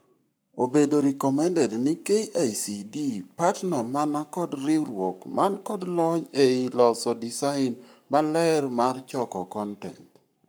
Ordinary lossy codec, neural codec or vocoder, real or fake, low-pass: none; codec, 44.1 kHz, 7.8 kbps, Pupu-Codec; fake; none